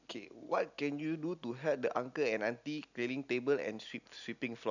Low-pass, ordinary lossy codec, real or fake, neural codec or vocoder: 7.2 kHz; none; real; none